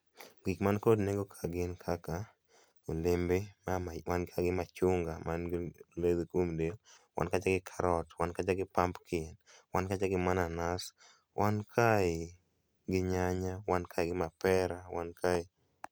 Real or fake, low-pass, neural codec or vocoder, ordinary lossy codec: real; none; none; none